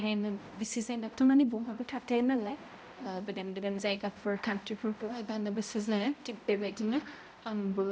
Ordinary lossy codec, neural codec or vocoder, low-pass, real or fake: none; codec, 16 kHz, 0.5 kbps, X-Codec, HuBERT features, trained on balanced general audio; none; fake